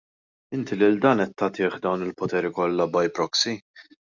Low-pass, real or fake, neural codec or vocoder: 7.2 kHz; real; none